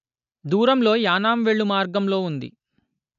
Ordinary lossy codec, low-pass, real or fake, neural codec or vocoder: none; 7.2 kHz; real; none